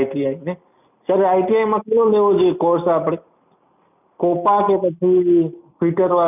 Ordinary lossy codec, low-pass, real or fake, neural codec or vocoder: none; 3.6 kHz; real; none